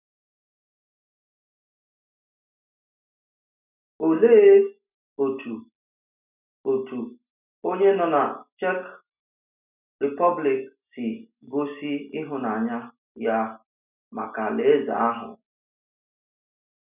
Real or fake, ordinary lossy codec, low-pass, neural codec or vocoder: real; none; 3.6 kHz; none